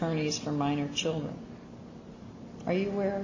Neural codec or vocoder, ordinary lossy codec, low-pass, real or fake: none; MP3, 32 kbps; 7.2 kHz; real